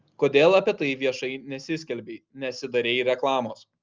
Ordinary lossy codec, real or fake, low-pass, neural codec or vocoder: Opus, 24 kbps; real; 7.2 kHz; none